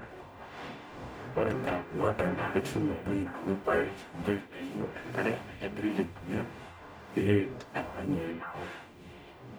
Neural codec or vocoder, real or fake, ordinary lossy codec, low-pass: codec, 44.1 kHz, 0.9 kbps, DAC; fake; none; none